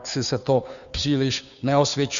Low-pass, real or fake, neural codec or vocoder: 7.2 kHz; fake; codec, 16 kHz, 2 kbps, FunCodec, trained on Chinese and English, 25 frames a second